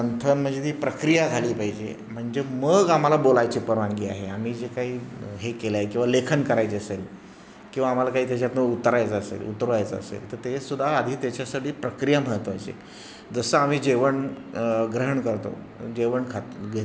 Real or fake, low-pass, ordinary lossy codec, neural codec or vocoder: real; none; none; none